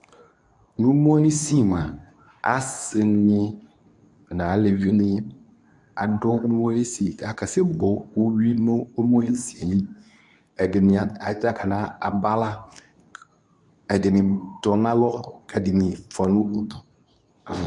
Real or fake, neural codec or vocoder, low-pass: fake; codec, 24 kHz, 0.9 kbps, WavTokenizer, medium speech release version 2; 10.8 kHz